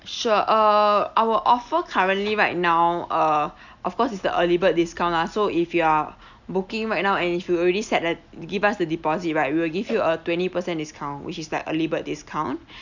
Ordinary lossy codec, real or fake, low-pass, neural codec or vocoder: none; real; 7.2 kHz; none